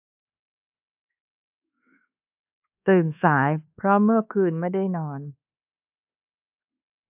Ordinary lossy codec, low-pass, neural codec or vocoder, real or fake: AAC, 32 kbps; 3.6 kHz; codec, 24 kHz, 1.2 kbps, DualCodec; fake